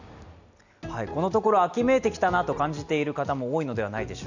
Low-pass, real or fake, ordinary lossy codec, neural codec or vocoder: 7.2 kHz; real; none; none